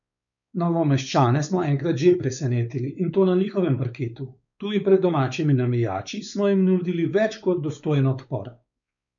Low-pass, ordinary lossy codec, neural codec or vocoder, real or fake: 7.2 kHz; none; codec, 16 kHz, 4 kbps, X-Codec, WavLM features, trained on Multilingual LibriSpeech; fake